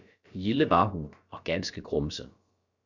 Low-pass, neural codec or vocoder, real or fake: 7.2 kHz; codec, 16 kHz, about 1 kbps, DyCAST, with the encoder's durations; fake